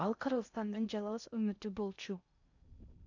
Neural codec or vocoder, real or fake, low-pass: codec, 16 kHz in and 24 kHz out, 0.6 kbps, FocalCodec, streaming, 2048 codes; fake; 7.2 kHz